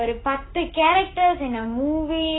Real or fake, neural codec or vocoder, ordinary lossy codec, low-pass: fake; codec, 16 kHz, 6 kbps, DAC; AAC, 16 kbps; 7.2 kHz